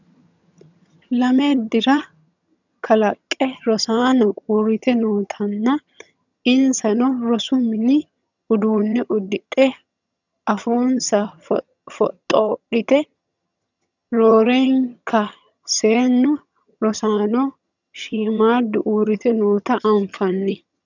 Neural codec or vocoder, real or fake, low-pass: vocoder, 22.05 kHz, 80 mel bands, HiFi-GAN; fake; 7.2 kHz